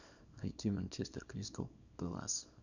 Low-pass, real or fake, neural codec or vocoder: 7.2 kHz; fake; codec, 24 kHz, 0.9 kbps, WavTokenizer, small release